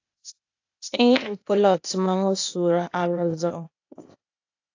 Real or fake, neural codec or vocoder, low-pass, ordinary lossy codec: fake; codec, 16 kHz, 0.8 kbps, ZipCodec; 7.2 kHz; AAC, 48 kbps